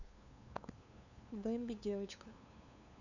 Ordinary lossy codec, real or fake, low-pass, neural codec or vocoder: none; fake; 7.2 kHz; codec, 16 kHz, 2 kbps, FunCodec, trained on LibriTTS, 25 frames a second